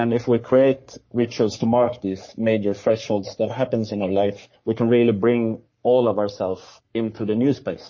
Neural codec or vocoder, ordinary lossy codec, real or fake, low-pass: codec, 44.1 kHz, 3.4 kbps, Pupu-Codec; MP3, 32 kbps; fake; 7.2 kHz